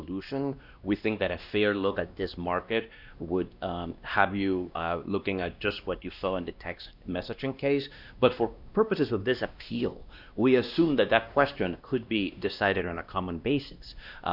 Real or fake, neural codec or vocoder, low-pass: fake; codec, 16 kHz, 1 kbps, X-Codec, WavLM features, trained on Multilingual LibriSpeech; 5.4 kHz